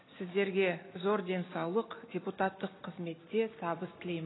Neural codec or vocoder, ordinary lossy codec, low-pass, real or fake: none; AAC, 16 kbps; 7.2 kHz; real